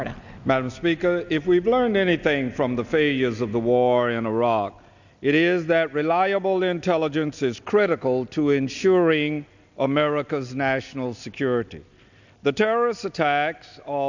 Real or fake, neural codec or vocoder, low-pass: real; none; 7.2 kHz